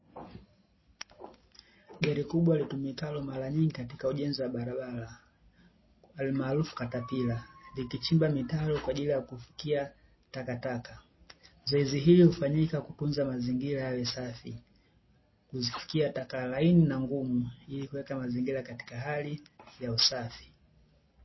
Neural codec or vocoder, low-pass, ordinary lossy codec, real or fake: none; 7.2 kHz; MP3, 24 kbps; real